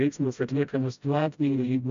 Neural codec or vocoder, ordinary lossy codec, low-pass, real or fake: codec, 16 kHz, 0.5 kbps, FreqCodec, smaller model; MP3, 64 kbps; 7.2 kHz; fake